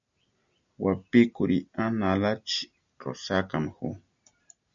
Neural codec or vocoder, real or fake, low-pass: none; real; 7.2 kHz